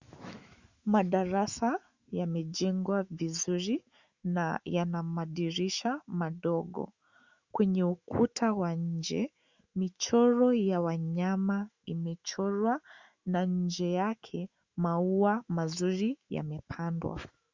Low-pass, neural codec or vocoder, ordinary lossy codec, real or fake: 7.2 kHz; autoencoder, 48 kHz, 128 numbers a frame, DAC-VAE, trained on Japanese speech; Opus, 64 kbps; fake